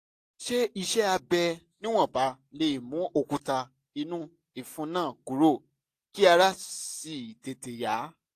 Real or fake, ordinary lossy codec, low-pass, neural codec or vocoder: real; AAC, 64 kbps; 14.4 kHz; none